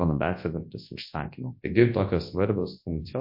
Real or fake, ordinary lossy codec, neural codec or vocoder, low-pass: fake; MP3, 32 kbps; codec, 24 kHz, 0.9 kbps, WavTokenizer, large speech release; 5.4 kHz